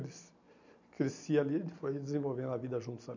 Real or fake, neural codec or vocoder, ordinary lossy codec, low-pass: real; none; none; 7.2 kHz